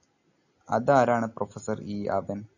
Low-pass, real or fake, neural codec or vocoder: 7.2 kHz; real; none